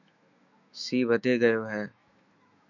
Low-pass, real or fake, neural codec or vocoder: 7.2 kHz; fake; autoencoder, 48 kHz, 128 numbers a frame, DAC-VAE, trained on Japanese speech